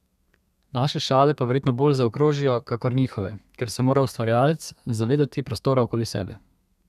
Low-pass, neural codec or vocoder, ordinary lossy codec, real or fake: 14.4 kHz; codec, 32 kHz, 1.9 kbps, SNAC; none; fake